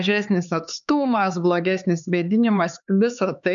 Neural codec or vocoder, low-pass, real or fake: codec, 16 kHz, 4 kbps, X-Codec, HuBERT features, trained on LibriSpeech; 7.2 kHz; fake